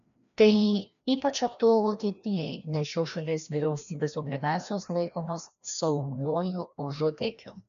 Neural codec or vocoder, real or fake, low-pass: codec, 16 kHz, 1 kbps, FreqCodec, larger model; fake; 7.2 kHz